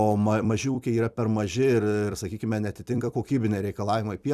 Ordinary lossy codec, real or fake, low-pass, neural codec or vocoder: Opus, 64 kbps; fake; 14.4 kHz; vocoder, 44.1 kHz, 128 mel bands every 256 samples, BigVGAN v2